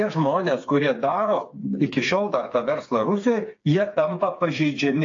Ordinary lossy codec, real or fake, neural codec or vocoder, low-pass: AAC, 48 kbps; fake; codec, 16 kHz, 4 kbps, FreqCodec, smaller model; 7.2 kHz